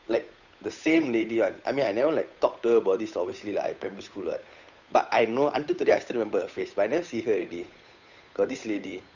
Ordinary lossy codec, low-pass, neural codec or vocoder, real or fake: none; 7.2 kHz; codec, 16 kHz, 8 kbps, FunCodec, trained on Chinese and English, 25 frames a second; fake